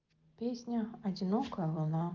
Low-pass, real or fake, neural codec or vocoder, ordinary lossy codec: 7.2 kHz; real; none; Opus, 24 kbps